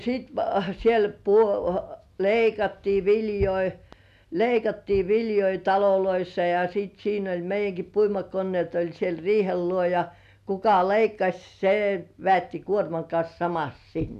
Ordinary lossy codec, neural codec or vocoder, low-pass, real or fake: none; none; 14.4 kHz; real